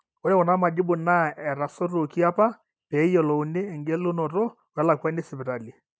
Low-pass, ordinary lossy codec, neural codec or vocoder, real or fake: none; none; none; real